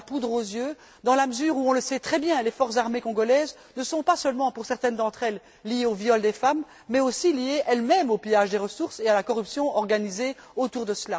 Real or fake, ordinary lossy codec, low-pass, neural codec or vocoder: real; none; none; none